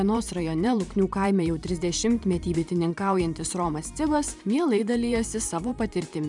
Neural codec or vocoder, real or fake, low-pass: vocoder, 44.1 kHz, 128 mel bands every 512 samples, BigVGAN v2; fake; 10.8 kHz